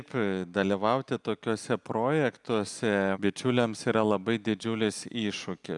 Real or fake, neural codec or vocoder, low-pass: real; none; 10.8 kHz